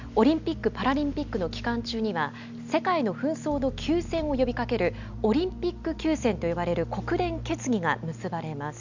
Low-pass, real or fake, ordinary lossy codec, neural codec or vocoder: 7.2 kHz; real; none; none